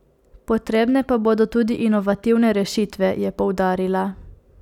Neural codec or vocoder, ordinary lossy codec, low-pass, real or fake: none; none; 19.8 kHz; real